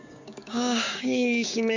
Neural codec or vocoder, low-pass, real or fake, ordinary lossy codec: codec, 16 kHz, 6 kbps, DAC; 7.2 kHz; fake; none